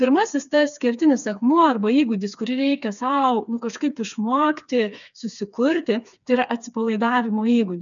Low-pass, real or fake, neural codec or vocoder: 7.2 kHz; fake; codec, 16 kHz, 4 kbps, FreqCodec, smaller model